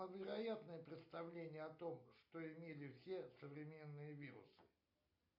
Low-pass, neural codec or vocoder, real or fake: 5.4 kHz; none; real